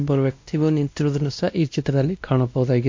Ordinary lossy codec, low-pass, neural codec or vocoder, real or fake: MP3, 48 kbps; 7.2 kHz; codec, 16 kHz, 1 kbps, X-Codec, WavLM features, trained on Multilingual LibriSpeech; fake